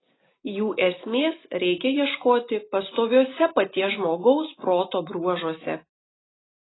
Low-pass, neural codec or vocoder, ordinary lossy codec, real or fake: 7.2 kHz; none; AAC, 16 kbps; real